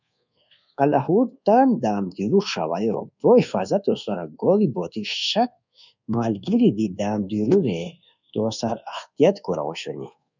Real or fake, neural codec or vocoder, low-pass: fake; codec, 24 kHz, 1.2 kbps, DualCodec; 7.2 kHz